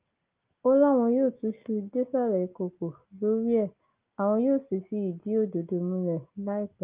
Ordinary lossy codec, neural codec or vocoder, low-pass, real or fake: Opus, 32 kbps; none; 3.6 kHz; real